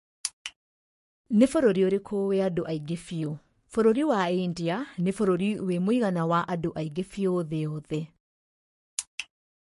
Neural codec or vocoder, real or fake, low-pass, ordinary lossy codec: codec, 44.1 kHz, 7.8 kbps, Pupu-Codec; fake; 14.4 kHz; MP3, 48 kbps